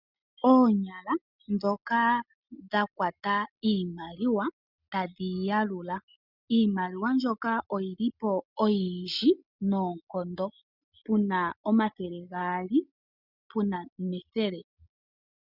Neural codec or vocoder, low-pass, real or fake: none; 5.4 kHz; real